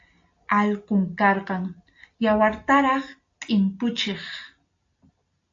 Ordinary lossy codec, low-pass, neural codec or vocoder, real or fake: AAC, 48 kbps; 7.2 kHz; none; real